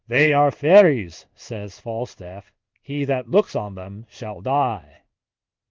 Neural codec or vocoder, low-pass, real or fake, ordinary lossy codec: codec, 16 kHz in and 24 kHz out, 1 kbps, XY-Tokenizer; 7.2 kHz; fake; Opus, 32 kbps